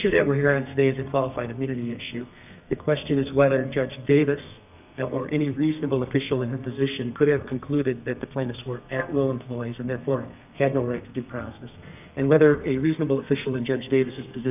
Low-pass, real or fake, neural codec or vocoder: 3.6 kHz; fake; codec, 32 kHz, 1.9 kbps, SNAC